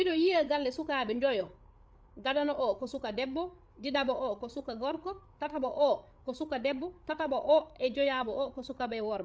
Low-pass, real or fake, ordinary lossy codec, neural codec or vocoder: none; fake; none; codec, 16 kHz, 8 kbps, FreqCodec, larger model